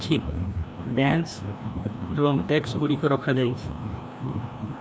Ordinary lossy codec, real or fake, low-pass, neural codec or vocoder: none; fake; none; codec, 16 kHz, 1 kbps, FreqCodec, larger model